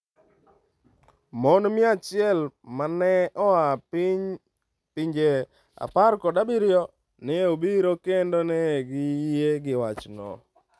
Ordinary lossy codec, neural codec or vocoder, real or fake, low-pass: none; none; real; none